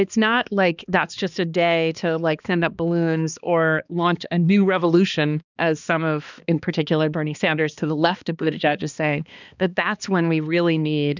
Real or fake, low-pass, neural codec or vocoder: fake; 7.2 kHz; codec, 16 kHz, 2 kbps, X-Codec, HuBERT features, trained on balanced general audio